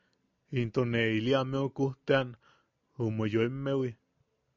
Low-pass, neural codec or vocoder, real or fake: 7.2 kHz; none; real